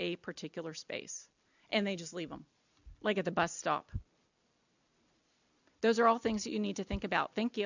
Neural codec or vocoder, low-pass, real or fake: none; 7.2 kHz; real